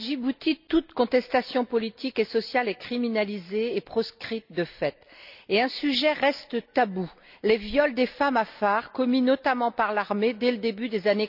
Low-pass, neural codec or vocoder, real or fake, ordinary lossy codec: 5.4 kHz; none; real; none